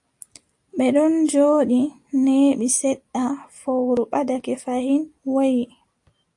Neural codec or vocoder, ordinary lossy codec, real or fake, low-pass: none; AAC, 64 kbps; real; 10.8 kHz